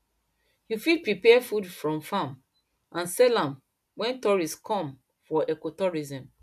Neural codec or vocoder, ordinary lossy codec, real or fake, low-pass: none; none; real; 14.4 kHz